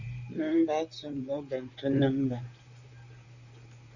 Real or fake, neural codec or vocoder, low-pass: fake; vocoder, 44.1 kHz, 128 mel bands, Pupu-Vocoder; 7.2 kHz